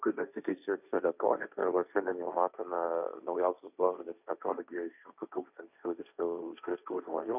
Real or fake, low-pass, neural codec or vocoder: fake; 3.6 kHz; codec, 16 kHz, 1.1 kbps, Voila-Tokenizer